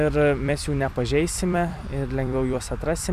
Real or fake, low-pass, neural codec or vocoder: fake; 14.4 kHz; vocoder, 44.1 kHz, 128 mel bands every 256 samples, BigVGAN v2